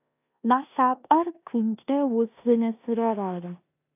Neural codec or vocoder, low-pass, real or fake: codec, 16 kHz in and 24 kHz out, 0.9 kbps, LongCat-Audio-Codec, four codebook decoder; 3.6 kHz; fake